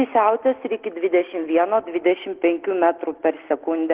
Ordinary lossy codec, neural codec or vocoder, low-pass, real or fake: Opus, 16 kbps; none; 3.6 kHz; real